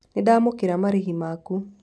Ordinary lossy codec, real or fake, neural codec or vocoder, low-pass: none; real; none; none